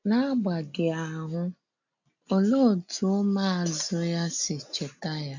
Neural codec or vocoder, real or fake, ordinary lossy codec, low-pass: none; real; none; 7.2 kHz